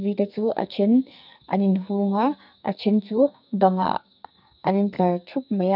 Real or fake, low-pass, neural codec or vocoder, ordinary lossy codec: fake; 5.4 kHz; codec, 44.1 kHz, 2.6 kbps, SNAC; none